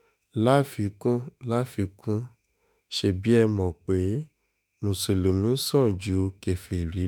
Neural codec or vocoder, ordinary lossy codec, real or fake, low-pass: autoencoder, 48 kHz, 32 numbers a frame, DAC-VAE, trained on Japanese speech; none; fake; none